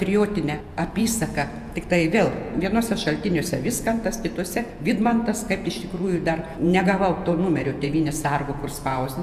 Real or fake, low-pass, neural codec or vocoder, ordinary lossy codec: fake; 14.4 kHz; vocoder, 48 kHz, 128 mel bands, Vocos; MP3, 96 kbps